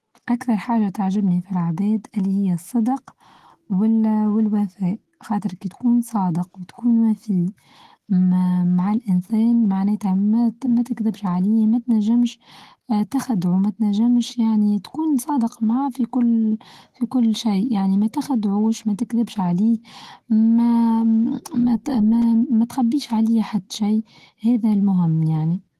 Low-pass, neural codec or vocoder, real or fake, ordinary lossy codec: 14.4 kHz; autoencoder, 48 kHz, 128 numbers a frame, DAC-VAE, trained on Japanese speech; fake; Opus, 16 kbps